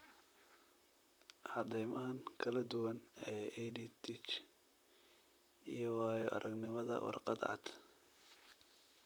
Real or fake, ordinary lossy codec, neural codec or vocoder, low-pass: fake; none; vocoder, 44.1 kHz, 128 mel bands, Pupu-Vocoder; none